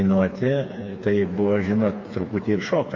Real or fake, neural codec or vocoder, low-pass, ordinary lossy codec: fake; codec, 16 kHz, 4 kbps, FreqCodec, smaller model; 7.2 kHz; MP3, 32 kbps